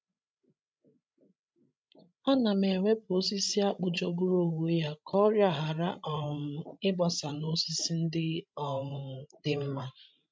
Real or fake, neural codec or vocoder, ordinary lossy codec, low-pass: fake; codec, 16 kHz, 16 kbps, FreqCodec, larger model; none; none